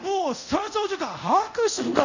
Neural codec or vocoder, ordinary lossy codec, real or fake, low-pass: codec, 24 kHz, 0.5 kbps, DualCodec; none; fake; 7.2 kHz